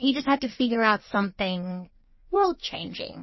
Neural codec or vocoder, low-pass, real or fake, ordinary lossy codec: codec, 16 kHz in and 24 kHz out, 1.1 kbps, FireRedTTS-2 codec; 7.2 kHz; fake; MP3, 24 kbps